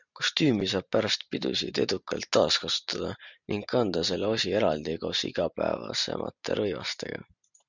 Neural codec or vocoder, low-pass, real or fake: vocoder, 44.1 kHz, 128 mel bands every 512 samples, BigVGAN v2; 7.2 kHz; fake